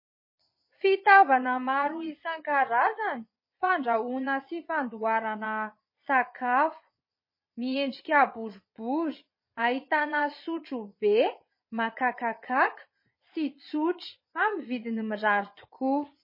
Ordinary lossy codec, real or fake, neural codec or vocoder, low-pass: MP3, 24 kbps; fake; vocoder, 22.05 kHz, 80 mel bands, Vocos; 5.4 kHz